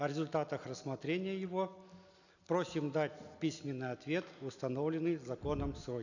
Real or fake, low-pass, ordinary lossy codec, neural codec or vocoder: real; 7.2 kHz; none; none